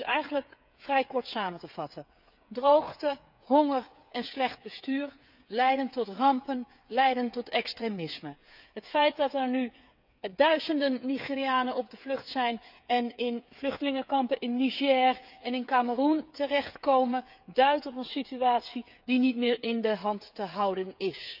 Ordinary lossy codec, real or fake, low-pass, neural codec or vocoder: none; fake; 5.4 kHz; codec, 16 kHz, 8 kbps, FreqCodec, smaller model